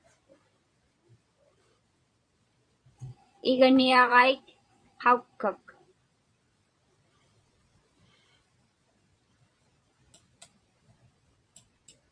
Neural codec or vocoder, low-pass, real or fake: vocoder, 22.05 kHz, 80 mel bands, Vocos; 9.9 kHz; fake